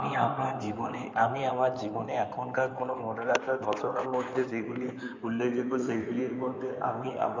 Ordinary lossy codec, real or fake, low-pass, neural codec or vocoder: MP3, 64 kbps; fake; 7.2 kHz; codec, 16 kHz in and 24 kHz out, 2.2 kbps, FireRedTTS-2 codec